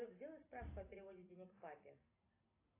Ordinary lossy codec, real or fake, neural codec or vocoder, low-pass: AAC, 24 kbps; real; none; 3.6 kHz